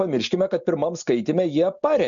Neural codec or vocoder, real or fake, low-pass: none; real; 7.2 kHz